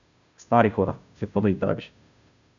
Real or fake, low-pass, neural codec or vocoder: fake; 7.2 kHz; codec, 16 kHz, 0.5 kbps, FunCodec, trained on Chinese and English, 25 frames a second